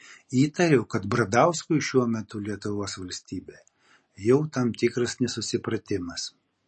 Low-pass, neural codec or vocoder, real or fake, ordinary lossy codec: 10.8 kHz; none; real; MP3, 32 kbps